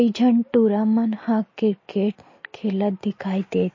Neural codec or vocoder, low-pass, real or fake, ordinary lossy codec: none; 7.2 kHz; real; MP3, 32 kbps